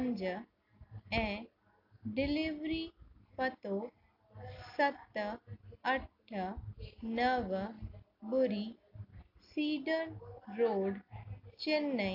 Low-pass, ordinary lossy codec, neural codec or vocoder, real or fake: 5.4 kHz; AAC, 48 kbps; none; real